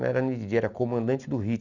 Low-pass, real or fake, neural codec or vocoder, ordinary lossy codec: 7.2 kHz; real; none; none